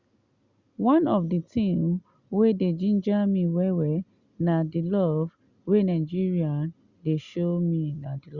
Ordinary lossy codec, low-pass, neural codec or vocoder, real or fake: Opus, 64 kbps; 7.2 kHz; none; real